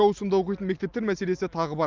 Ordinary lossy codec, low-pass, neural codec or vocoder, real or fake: Opus, 24 kbps; 7.2 kHz; none; real